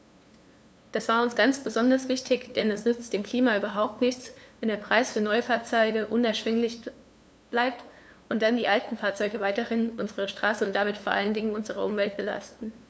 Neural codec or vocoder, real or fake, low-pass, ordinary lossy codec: codec, 16 kHz, 2 kbps, FunCodec, trained on LibriTTS, 25 frames a second; fake; none; none